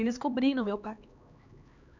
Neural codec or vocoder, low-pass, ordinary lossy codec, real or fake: codec, 16 kHz, 4 kbps, X-Codec, HuBERT features, trained on LibriSpeech; 7.2 kHz; none; fake